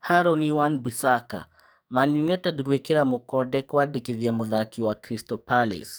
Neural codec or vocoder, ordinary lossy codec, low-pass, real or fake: codec, 44.1 kHz, 2.6 kbps, SNAC; none; none; fake